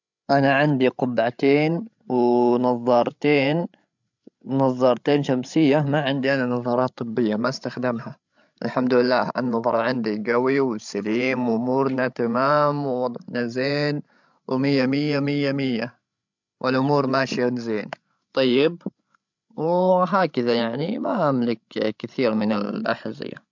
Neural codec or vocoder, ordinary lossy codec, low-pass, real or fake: codec, 16 kHz, 16 kbps, FreqCodec, larger model; MP3, 64 kbps; 7.2 kHz; fake